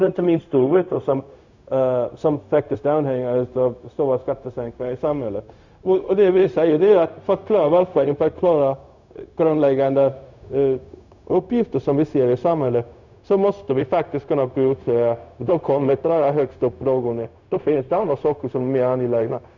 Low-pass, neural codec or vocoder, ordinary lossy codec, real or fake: 7.2 kHz; codec, 16 kHz, 0.4 kbps, LongCat-Audio-Codec; none; fake